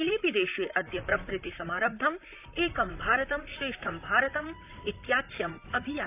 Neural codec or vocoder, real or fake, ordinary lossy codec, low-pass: vocoder, 44.1 kHz, 128 mel bands, Pupu-Vocoder; fake; none; 3.6 kHz